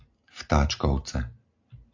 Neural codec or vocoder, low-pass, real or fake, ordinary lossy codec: none; 7.2 kHz; real; AAC, 48 kbps